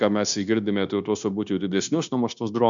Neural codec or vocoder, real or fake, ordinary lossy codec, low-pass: codec, 16 kHz, 0.9 kbps, LongCat-Audio-Codec; fake; MP3, 96 kbps; 7.2 kHz